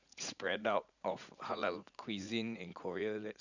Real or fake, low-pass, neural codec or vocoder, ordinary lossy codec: fake; 7.2 kHz; vocoder, 44.1 kHz, 80 mel bands, Vocos; none